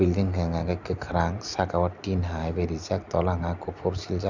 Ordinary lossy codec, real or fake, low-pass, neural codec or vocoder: none; real; 7.2 kHz; none